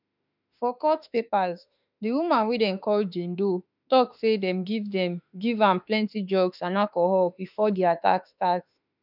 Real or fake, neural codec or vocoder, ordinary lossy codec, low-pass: fake; autoencoder, 48 kHz, 32 numbers a frame, DAC-VAE, trained on Japanese speech; none; 5.4 kHz